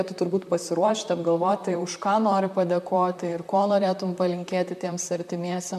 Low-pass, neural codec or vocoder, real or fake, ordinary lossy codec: 14.4 kHz; vocoder, 44.1 kHz, 128 mel bands, Pupu-Vocoder; fake; MP3, 96 kbps